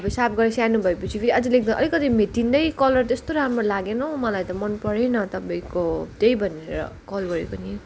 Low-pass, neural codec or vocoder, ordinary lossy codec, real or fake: none; none; none; real